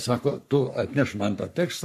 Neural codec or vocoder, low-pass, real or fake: codec, 44.1 kHz, 3.4 kbps, Pupu-Codec; 14.4 kHz; fake